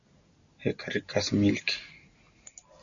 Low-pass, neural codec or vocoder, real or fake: 7.2 kHz; none; real